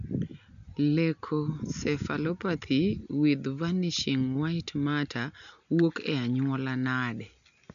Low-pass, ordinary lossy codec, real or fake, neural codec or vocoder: 7.2 kHz; none; real; none